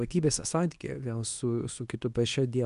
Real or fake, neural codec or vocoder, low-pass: fake; codec, 24 kHz, 0.9 kbps, WavTokenizer, medium speech release version 2; 10.8 kHz